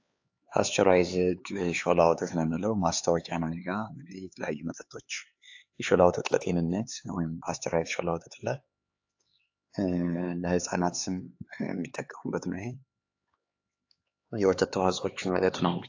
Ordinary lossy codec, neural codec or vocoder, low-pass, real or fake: AAC, 48 kbps; codec, 16 kHz, 4 kbps, X-Codec, HuBERT features, trained on LibriSpeech; 7.2 kHz; fake